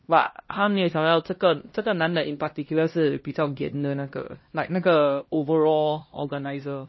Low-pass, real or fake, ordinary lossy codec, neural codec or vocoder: 7.2 kHz; fake; MP3, 24 kbps; codec, 16 kHz, 1 kbps, X-Codec, HuBERT features, trained on LibriSpeech